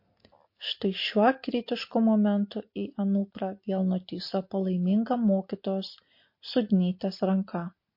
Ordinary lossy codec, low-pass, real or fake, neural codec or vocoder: MP3, 32 kbps; 5.4 kHz; real; none